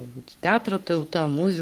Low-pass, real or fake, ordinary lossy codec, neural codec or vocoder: 14.4 kHz; fake; Opus, 32 kbps; codec, 44.1 kHz, 3.4 kbps, Pupu-Codec